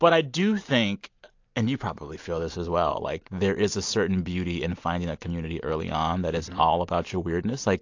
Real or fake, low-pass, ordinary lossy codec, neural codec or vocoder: real; 7.2 kHz; AAC, 48 kbps; none